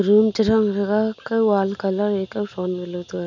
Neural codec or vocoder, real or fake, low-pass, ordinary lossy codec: none; real; 7.2 kHz; none